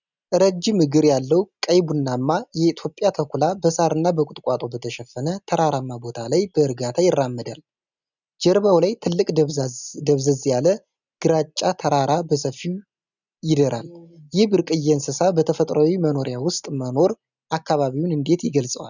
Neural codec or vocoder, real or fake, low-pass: none; real; 7.2 kHz